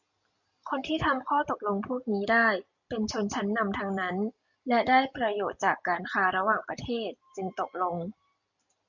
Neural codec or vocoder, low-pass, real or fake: none; 7.2 kHz; real